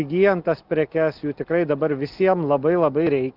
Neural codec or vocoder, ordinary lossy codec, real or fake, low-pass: none; Opus, 24 kbps; real; 5.4 kHz